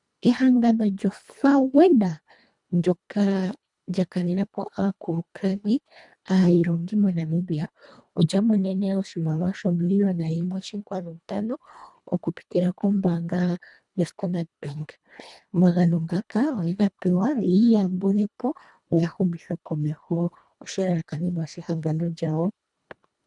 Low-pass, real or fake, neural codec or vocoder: 10.8 kHz; fake; codec, 24 kHz, 1.5 kbps, HILCodec